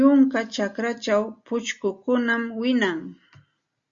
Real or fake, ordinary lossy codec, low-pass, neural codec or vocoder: real; Opus, 64 kbps; 7.2 kHz; none